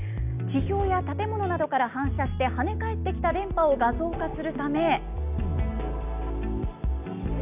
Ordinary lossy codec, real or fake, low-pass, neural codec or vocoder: none; real; 3.6 kHz; none